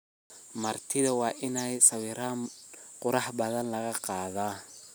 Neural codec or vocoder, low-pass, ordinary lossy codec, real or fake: none; none; none; real